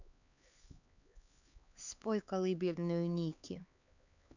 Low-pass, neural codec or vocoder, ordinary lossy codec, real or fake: 7.2 kHz; codec, 16 kHz, 4 kbps, X-Codec, HuBERT features, trained on LibriSpeech; none; fake